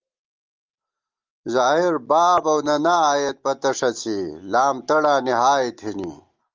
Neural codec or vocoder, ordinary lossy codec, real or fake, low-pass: none; Opus, 32 kbps; real; 7.2 kHz